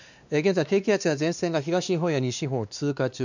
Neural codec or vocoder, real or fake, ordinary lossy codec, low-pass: codec, 16 kHz, 2 kbps, X-Codec, WavLM features, trained on Multilingual LibriSpeech; fake; none; 7.2 kHz